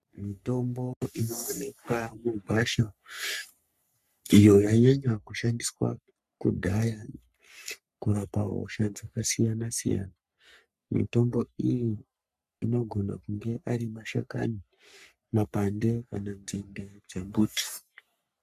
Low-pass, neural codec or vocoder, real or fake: 14.4 kHz; codec, 44.1 kHz, 3.4 kbps, Pupu-Codec; fake